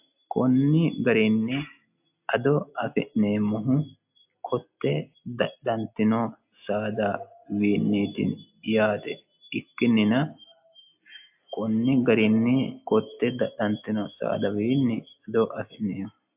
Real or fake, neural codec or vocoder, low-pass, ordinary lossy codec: real; none; 3.6 kHz; AAC, 32 kbps